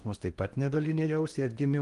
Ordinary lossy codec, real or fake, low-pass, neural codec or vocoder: Opus, 24 kbps; fake; 10.8 kHz; codec, 16 kHz in and 24 kHz out, 0.8 kbps, FocalCodec, streaming, 65536 codes